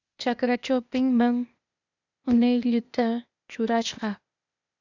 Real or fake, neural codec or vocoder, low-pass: fake; codec, 16 kHz, 0.8 kbps, ZipCodec; 7.2 kHz